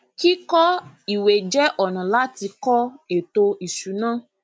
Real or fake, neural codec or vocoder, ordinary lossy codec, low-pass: real; none; none; none